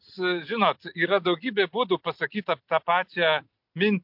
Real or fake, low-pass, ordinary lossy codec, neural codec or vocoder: real; 5.4 kHz; MP3, 48 kbps; none